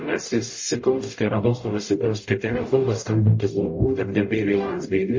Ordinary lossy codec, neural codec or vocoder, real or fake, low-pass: MP3, 32 kbps; codec, 44.1 kHz, 0.9 kbps, DAC; fake; 7.2 kHz